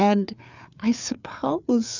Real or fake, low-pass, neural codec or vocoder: fake; 7.2 kHz; codec, 16 kHz, 4 kbps, FreqCodec, larger model